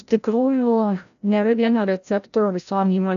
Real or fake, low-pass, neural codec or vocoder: fake; 7.2 kHz; codec, 16 kHz, 0.5 kbps, FreqCodec, larger model